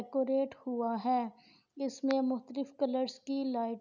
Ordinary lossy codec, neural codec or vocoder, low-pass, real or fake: none; none; 7.2 kHz; real